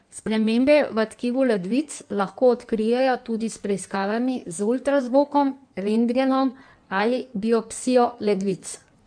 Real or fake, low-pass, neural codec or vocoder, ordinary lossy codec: fake; 9.9 kHz; codec, 16 kHz in and 24 kHz out, 1.1 kbps, FireRedTTS-2 codec; none